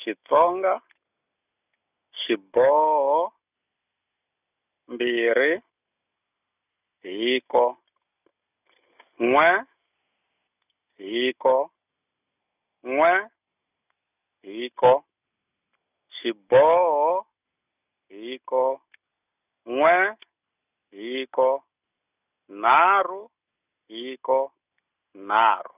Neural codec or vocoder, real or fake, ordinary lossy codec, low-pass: none; real; none; 3.6 kHz